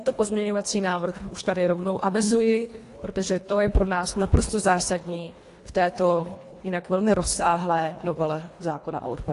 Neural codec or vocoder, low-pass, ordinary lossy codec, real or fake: codec, 24 kHz, 1.5 kbps, HILCodec; 10.8 kHz; AAC, 48 kbps; fake